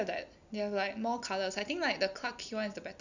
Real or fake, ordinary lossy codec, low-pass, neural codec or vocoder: real; none; 7.2 kHz; none